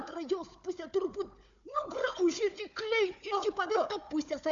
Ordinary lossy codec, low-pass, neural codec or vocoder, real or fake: MP3, 64 kbps; 7.2 kHz; codec, 16 kHz, 4 kbps, FunCodec, trained on Chinese and English, 50 frames a second; fake